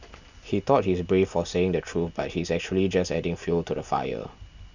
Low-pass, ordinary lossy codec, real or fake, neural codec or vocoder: 7.2 kHz; none; real; none